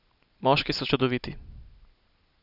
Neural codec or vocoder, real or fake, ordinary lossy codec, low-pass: none; real; none; 5.4 kHz